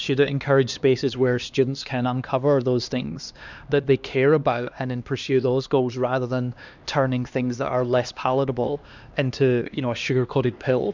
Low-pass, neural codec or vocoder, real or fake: 7.2 kHz; codec, 16 kHz, 2 kbps, X-Codec, HuBERT features, trained on LibriSpeech; fake